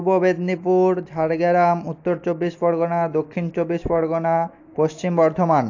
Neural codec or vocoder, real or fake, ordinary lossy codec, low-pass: none; real; AAC, 48 kbps; 7.2 kHz